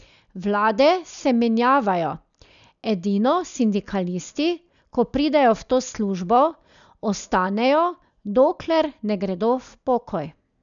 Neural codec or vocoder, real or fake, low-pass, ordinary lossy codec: none; real; 7.2 kHz; none